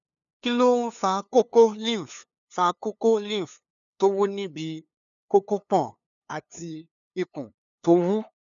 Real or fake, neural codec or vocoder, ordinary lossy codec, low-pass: fake; codec, 16 kHz, 2 kbps, FunCodec, trained on LibriTTS, 25 frames a second; none; 7.2 kHz